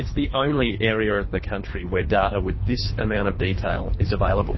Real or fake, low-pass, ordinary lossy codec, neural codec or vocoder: fake; 7.2 kHz; MP3, 24 kbps; codec, 24 kHz, 3 kbps, HILCodec